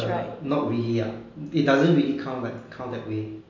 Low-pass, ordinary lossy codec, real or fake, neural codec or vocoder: 7.2 kHz; MP3, 64 kbps; real; none